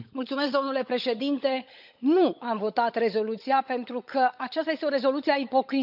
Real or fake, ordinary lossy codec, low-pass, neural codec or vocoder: fake; none; 5.4 kHz; codec, 16 kHz, 16 kbps, FunCodec, trained on LibriTTS, 50 frames a second